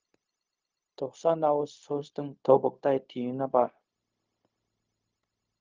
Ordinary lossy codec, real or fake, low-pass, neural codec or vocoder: Opus, 24 kbps; fake; 7.2 kHz; codec, 16 kHz, 0.4 kbps, LongCat-Audio-Codec